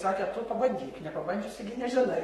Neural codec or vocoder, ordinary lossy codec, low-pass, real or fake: codec, 44.1 kHz, 7.8 kbps, Pupu-Codec; AAC, 32 kbps; 19.8 kHz; fake